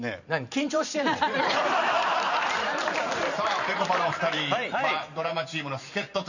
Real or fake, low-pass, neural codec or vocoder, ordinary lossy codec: fake; 7.2 kHz; vocoder, 44.1 kHz, 128 mel bands every 512 samples, BigVGAN v2; none